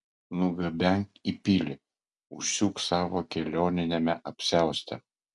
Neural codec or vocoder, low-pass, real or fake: none; 10.8 kHz; real